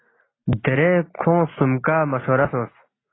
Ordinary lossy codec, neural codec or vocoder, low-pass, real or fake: AAC, 16 kbps; none; 7.2 kHz; real